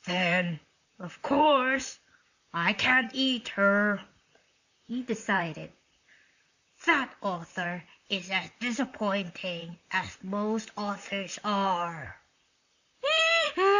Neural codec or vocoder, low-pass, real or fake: vocoder, 44.1 kHz, 128 mel bands, Pupu-Vocoder; 7.2 kHz; fake